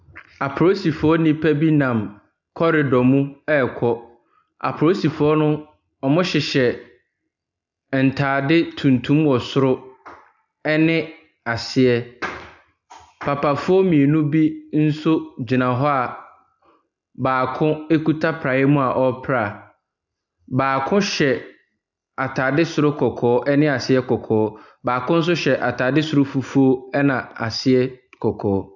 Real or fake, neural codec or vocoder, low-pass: real; none; 7.2 kHz